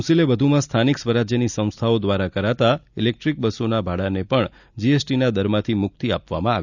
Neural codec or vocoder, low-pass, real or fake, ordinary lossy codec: none; 7.2 kHz; real; none